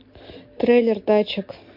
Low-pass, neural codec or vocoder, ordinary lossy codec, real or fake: 5.4 kHz; none; MP3, 32 kbps; real